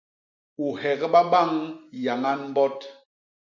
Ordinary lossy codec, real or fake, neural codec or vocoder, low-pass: MP3, 64 kbps; real; none; 7.2 kHz